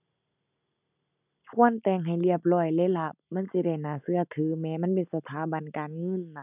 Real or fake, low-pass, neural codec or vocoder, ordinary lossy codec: real; 3.6 kHz; none; none